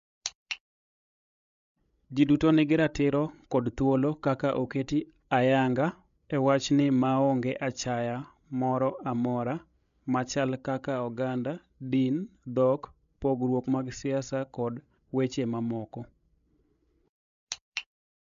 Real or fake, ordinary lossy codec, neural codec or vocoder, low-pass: fake; MP3, 64 kbps; codec, 16 kHz, 16 kbps, FreqCodec, larger model; 7.2 kHz